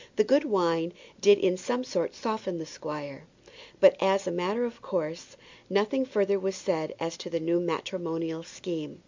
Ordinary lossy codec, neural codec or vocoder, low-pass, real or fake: MP3, 64 kbps; none; 7.2 kHz; real